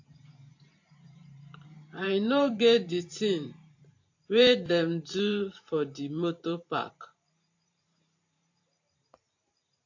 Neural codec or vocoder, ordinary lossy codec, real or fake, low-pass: vocoder, 44.1 kHz, 128 mel bands every 512 samples, BigVGAN v2; AAC, 48 kbps; fake; 7.2 kHz